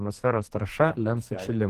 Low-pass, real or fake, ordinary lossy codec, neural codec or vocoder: 14.4 kHz; fake; Opus, 16 kbps; codec, 44.1 kHz, 2.6 kbps, SNAC